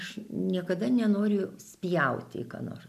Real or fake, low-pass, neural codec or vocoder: real; 14.4 kHz; none